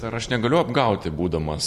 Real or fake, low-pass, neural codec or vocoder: real; 14.4 kHz; none